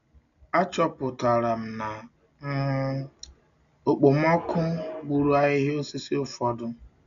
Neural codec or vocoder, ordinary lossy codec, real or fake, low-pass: none; none; real; 7.2 kHz